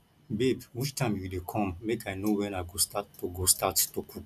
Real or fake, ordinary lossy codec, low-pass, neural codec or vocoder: real; none; 14.4 kHz; none